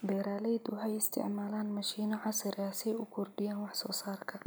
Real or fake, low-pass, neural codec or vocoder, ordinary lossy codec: real; none; none; none